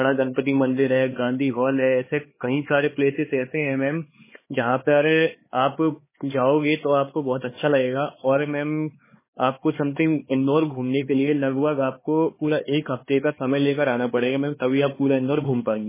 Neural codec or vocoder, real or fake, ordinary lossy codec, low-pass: codec, 16 kHz, 4 kbps, X-Codec, HuBERT features, trained on LibriSpeech; fake; MP3, 16 kbps; 3.6 kHz